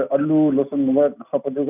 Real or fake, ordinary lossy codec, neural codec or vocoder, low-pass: real; none; none; 3.6 kHz